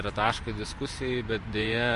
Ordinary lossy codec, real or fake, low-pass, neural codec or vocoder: MP3, 48 kbps; fake; 14.4 kHz; vocoder, 44.1 kHz, 128 mel bands every 512 samples, BigVGAN v2